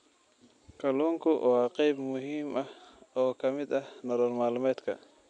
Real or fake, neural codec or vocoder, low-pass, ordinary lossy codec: real; none; 9.9 kHz; none